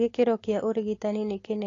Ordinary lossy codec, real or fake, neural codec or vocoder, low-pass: AAC, 32 kbps; real; none; 7.2 kHz